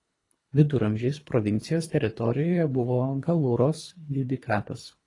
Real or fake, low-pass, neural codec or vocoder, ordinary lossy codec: fake; 10.8 kHz; codec, 24 kHz, 3 kbps, HILCodec; AAC, 32 kbps